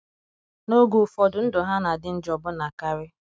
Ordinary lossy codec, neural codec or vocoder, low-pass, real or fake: none; none; none; real